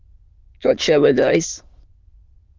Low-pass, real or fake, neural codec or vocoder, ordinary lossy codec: 7.2 kHz; fake; autoencoder, 22.05 kHz, a latent of 192 numbers a frame, VITS, trained on many speakers; Opus, 32 kbps